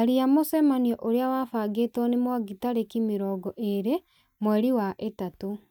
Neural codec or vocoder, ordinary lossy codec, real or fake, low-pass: none; none; real; 19.8 kHz